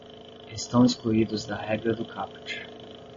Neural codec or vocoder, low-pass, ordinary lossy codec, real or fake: none; 7.2 kHz; MP3, 32 kbps; real